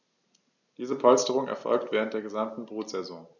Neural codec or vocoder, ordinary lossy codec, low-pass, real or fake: none; none; 7.2 kHz; real